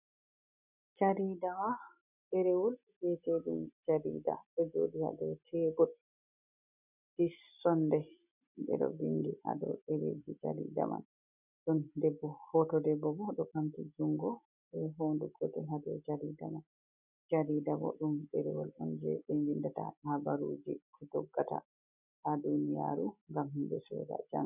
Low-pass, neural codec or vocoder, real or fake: 3.6 kHz; none; real